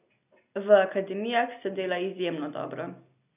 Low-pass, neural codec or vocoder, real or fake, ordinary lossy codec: 3.6 kHz; none; real; none